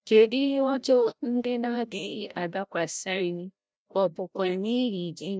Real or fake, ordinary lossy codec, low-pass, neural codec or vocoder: fake; none; none; codec, 16 kHz, 0.5 kbps, FreqCodec, larger model